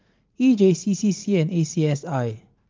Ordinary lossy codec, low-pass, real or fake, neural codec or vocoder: Opus, 32 kbps; 7.2 kHz; fake; vocoder, 44.1 kHz, 80 mel bands, Vocos